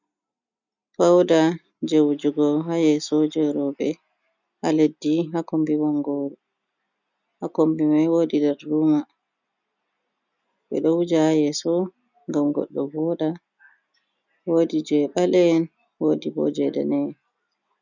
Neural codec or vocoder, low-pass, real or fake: none; 7.2 kHz; real